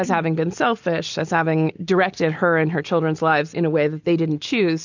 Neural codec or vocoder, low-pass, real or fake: none; 7.2 kHz; real